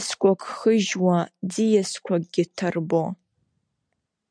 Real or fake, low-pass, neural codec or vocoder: real; 9.9 kHz; none